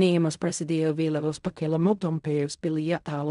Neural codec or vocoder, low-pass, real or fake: codec, 16 kHz in and 24 kHz out, 0.4 kbps, LongCat-Audio-Codec, fine tuned four codebook decoder; 10.8 kHz; fake